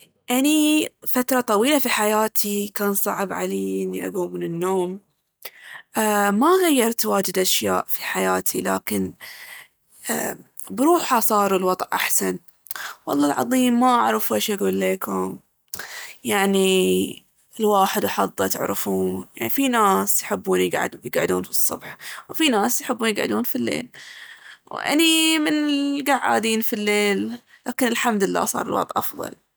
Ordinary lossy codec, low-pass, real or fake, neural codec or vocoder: none; none; real; none